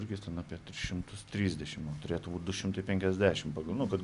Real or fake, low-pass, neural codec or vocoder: real; 10.8 kHz; none